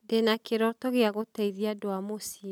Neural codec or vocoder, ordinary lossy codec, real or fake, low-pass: none; none; real; 19.8 kHz